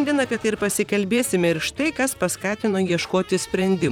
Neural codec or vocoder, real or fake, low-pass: vocoder, 44.1 kHz, 128 mel bands, Pupu-Vocoder; fake; 19.8 kHz